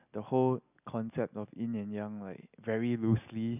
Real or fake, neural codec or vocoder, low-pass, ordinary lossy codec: real; none; 3.6 kHz; none